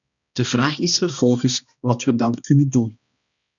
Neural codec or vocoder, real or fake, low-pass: codec, 16 kHz, 1 kbps, X-Codec, HuBERT features, trained on balanced general audio; fake; 7.2 kHz